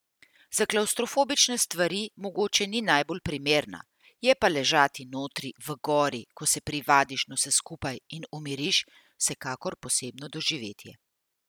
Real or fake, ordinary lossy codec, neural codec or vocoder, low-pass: real; none; none; none